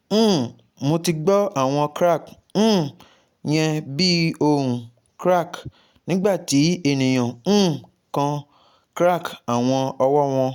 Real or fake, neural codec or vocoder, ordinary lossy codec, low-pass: real; none; none; 19.8 kHz